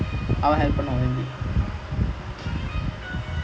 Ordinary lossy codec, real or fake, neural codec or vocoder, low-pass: none; real; none; none